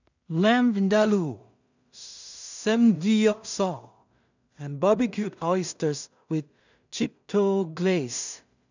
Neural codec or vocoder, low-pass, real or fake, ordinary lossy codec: codec, 16 kHz in and 24 kHz out, 0.4 kbps, LongCat-Audio-Codec, two codebook decoder; 7.2 kHz; fake; none